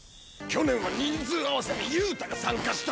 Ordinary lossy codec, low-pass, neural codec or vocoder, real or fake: none; none; none; real